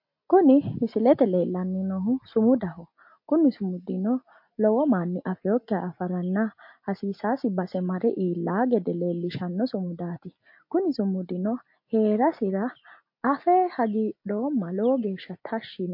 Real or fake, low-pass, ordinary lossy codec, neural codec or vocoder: real; 5.4 kHz; MP3, 32 kbps; none